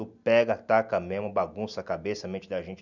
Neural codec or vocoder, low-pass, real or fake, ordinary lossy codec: none; 7.2 kHz; real; none